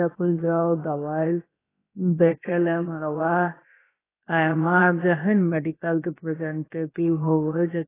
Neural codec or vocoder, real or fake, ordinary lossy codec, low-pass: codec, 16 kHz, about 1 kbps, DyCAST, with the encoder's durations; fake; AAC, 16 kbps; 3.6 kHz